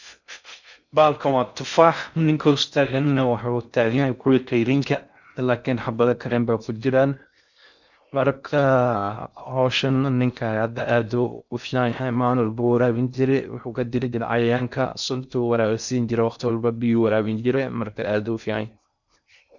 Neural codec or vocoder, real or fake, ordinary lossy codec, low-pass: codec, 16 kHz in and 24 kHz out, 0.6 kbps, FocalCodec, streaming, 4096 codes; fake; none; 7.2 kHz